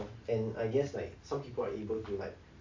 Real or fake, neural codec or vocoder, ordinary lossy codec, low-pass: real; none; AAC, 48 kbps; 7.2 kHz